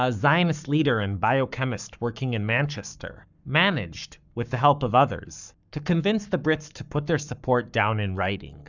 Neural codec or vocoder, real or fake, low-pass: codec, 44.1 kHz, 7.8 kbps, Pupu-Codec; fake; 7.2 kHz